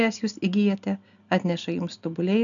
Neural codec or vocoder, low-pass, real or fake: none; 7.2 kHz; real